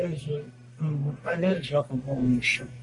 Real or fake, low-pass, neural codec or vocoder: fake; 10.8 kHz; codec, 44.1 kHz, 1.7 kbps, Pupu-Codec